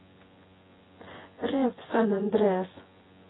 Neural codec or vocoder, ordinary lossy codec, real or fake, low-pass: vocoder, 24 kHz, 100 mel bands, Vocos; AAC, 16 kbps; fake; 7.2 kHz